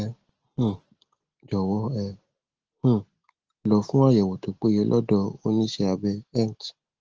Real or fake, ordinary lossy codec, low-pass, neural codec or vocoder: real; Opus, 16 kbps; 7.2 kHz; none